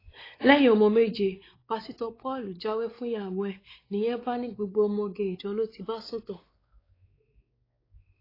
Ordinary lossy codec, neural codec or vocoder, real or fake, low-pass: AAC, 24 kbps; codec, 16 kHz, 4 kbps, X-Codec, WavLM features, trained on Multilingual LibriSpeech; fake; 5.4 kHz